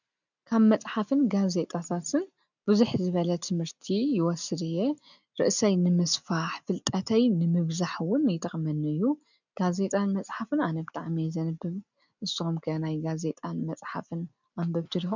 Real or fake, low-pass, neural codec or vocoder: real; 7.2 kHz; none